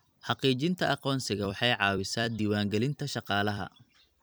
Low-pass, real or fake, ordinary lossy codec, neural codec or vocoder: none; fake; none; vocoder, 44.1 kHz, 128 mel bands every 256 samples, BigVGAN v2